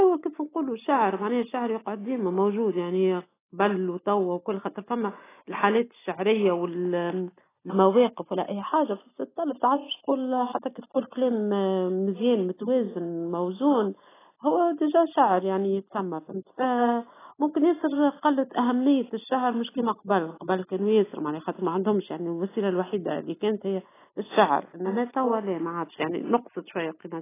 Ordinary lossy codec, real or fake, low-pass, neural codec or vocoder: AAC, 16 kbps; real; 3.6 kHz; none